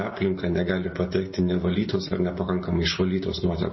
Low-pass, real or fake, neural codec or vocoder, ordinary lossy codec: 7.2 kHz; real; none; MP3, 24 kbps